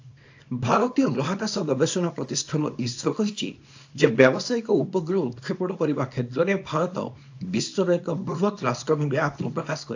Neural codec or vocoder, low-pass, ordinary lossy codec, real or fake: codec, 24 kHz, 0.9 kbps, WavTokenizer, small release; 7.2 kHz; AAC, 48 kbps; fake